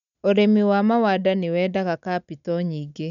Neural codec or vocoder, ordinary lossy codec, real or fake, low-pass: none; none; real; 7.2 kHz